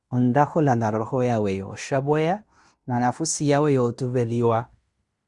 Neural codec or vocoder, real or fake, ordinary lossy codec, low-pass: codec, 16 kHz in and 24 kHz out, 0.9 kbps, LongCat-Audio-Codec, fine tuned four codebook decoder; fake; Opus, 64 kbps; 10.8 kHz